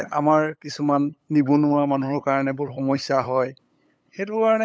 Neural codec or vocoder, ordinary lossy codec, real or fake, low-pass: codec, 16 kHz, 8 kbps, FunCodec, trained on LibriTTS, 25 frames a second; none; fake; none